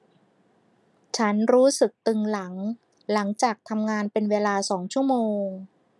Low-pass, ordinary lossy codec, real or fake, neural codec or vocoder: none; none; real; none